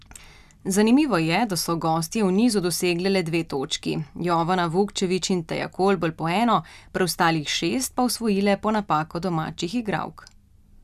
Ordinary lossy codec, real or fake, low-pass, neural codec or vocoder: none; real; 14.4 kHz; none